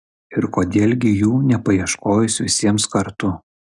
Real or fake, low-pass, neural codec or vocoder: real; 10.8 kHz; none